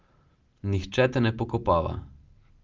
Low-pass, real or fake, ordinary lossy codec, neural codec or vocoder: 7.2 kHz; real; Opus, 32 kbps; none